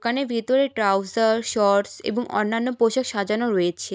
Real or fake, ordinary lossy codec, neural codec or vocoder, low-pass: real; none; none; none